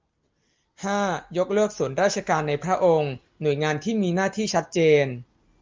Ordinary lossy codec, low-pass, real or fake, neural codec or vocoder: Opus, 24 kbps; 7.2 kHz; real; none